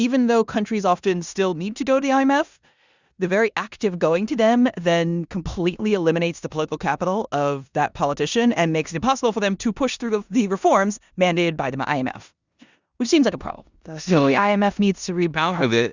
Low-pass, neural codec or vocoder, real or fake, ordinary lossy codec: 7.2 kHz; codec, 16 kHz in and 24 kHz out, 0.9 kbps, LongCat-Audio-Codec, four codebook decoder; fake; Opus, 64 kbps